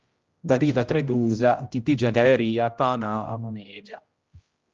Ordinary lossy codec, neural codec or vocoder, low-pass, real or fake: Opus, 32 kbps; codec, 16 kHz, 0.5 kbps, X-Codec, HuBERT features, trained on general audio; 7.2 kHz; fake